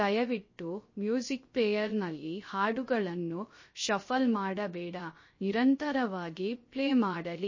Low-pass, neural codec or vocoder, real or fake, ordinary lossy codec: 7.2 kHz; codec, 16 kHz, 0.3 kbps, FocalCodec; fake; MP3, 32 kbps